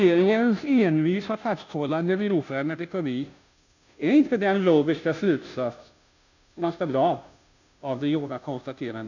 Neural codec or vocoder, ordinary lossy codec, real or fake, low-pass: codec, 16 kHz, 0.5 kbps, FunCodec, trained on Chinese and English, 25 frames a second; none; fake; 7.2 kHz